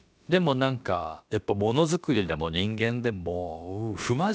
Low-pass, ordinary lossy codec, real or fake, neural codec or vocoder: none; none; fake; codec, 16 kHz, about 1 kbps, DyCAST, with the encoder's durations